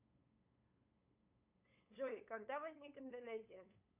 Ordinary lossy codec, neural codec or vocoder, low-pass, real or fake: AAC, 32 kbps; codec, 16 kHz, 8 kbps, FunCodec, trained on LibriTTS, 25 frames a second; 3.6 kHz; fake